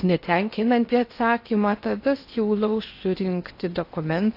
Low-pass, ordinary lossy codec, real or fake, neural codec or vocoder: 5.4 kHz; AAC, 32 kbps; fake; codec, 16 kHz in and 24 kHz out, 0.6 kbps, FocalCodec, streaming, 4096 codes